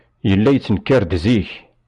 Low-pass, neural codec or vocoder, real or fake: 10.8 kHz; none; real